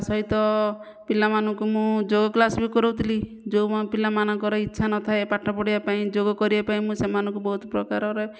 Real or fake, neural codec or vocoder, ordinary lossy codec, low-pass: real; none; none; none